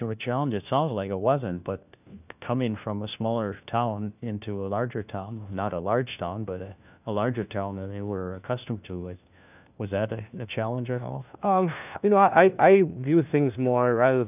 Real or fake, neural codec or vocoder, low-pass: fake; codec, 16 kHz, 1 kbps, FunCodec, trained on LibriTTS, 50 frames a second; 3.6 kHz